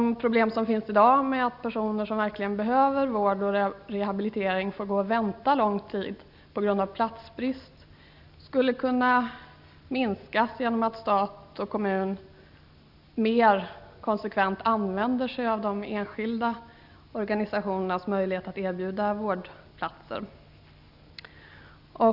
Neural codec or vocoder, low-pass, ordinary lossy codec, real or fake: none; 5.4 kHz; none; real